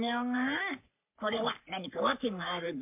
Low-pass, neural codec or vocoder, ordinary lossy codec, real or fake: 3.6 kHz; codec, 44.1 kHz, 3.4 kbps, Pupu-Codec; MP3, 32 kbps; fake